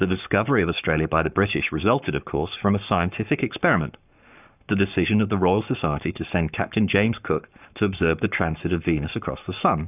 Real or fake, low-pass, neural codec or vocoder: fake; 3.6 kHz; codec, 44.1 kHz, 7.8 kbps, Pupu-Codec